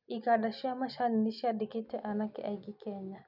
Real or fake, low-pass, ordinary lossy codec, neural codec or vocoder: real; 5.4 kHz; none; none